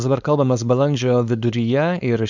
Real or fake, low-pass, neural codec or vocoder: fake; 7.2 kHz; codec, 16 kHz, 4.8 kbps, FACodec